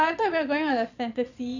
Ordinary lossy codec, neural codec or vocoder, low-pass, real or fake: none; vocoder, 22.05 kHz, 80 mel bands, Vocos; 7.2 kHz; fake